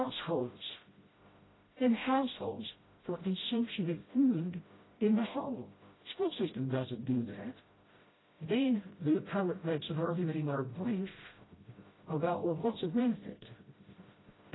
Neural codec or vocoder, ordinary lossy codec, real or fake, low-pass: codec, 16 kHz, 0.5 kbps, FreqCodec, smaller model; AAC, 16 kbps; fake; 7.2 kHz